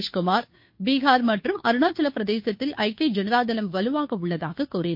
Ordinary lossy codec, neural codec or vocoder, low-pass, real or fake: MP3, 32 kbps; codec, 16 kHz, 2 kbps, FunCodec, trained on Chinese and English, 25 frames a second; 5.4 kHz; fake